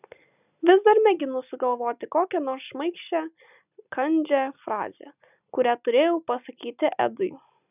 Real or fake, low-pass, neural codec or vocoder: real; 3.6 kHz; none